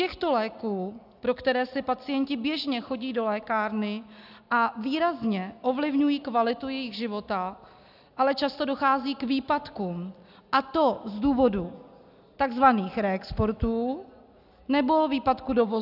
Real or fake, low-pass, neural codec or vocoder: real; 5.4 kHz; none